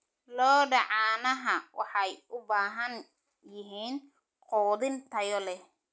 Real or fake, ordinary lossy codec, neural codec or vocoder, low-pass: real; none; none; none